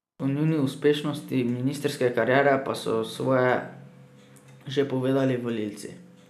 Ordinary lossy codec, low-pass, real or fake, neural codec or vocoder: none; 14.4 kHz; real; none